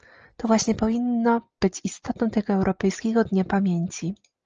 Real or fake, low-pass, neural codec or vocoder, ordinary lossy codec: real; 7.2 kHz; none; Opus, 24 kbps